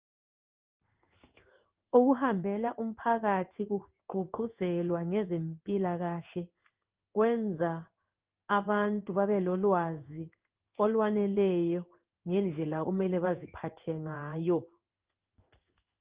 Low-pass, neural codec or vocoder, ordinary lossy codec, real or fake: 3.6 kHz; codec, 16 kHz in and 24 kHz out, 1 kbps, XY-Tokenizer; Opus, 32 kbps; fake